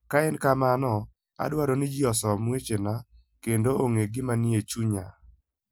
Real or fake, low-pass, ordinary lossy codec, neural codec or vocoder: real; none; none; none